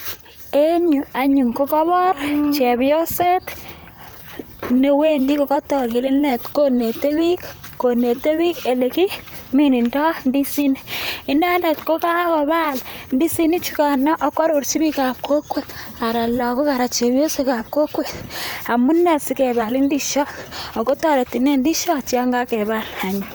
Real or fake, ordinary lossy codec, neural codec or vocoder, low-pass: fake; none; vocoder, 44.1 kHz, 128 mel bands, Pupu-Vocoder; none